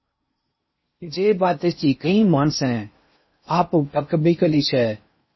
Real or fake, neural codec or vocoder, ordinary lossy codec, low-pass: fake; codec, 16 kHz in and 24 kHz out, 0.6 kbps, FocalCodec, streaming, 4096 codes; MP3, 24 kbps; 7.2 kHz